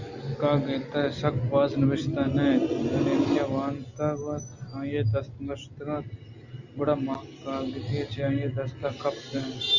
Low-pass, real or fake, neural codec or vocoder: 7.2 kHz; real; none